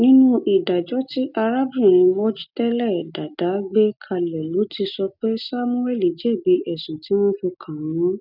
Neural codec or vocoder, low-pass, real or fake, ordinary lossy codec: none; 5.4 kHz; real; none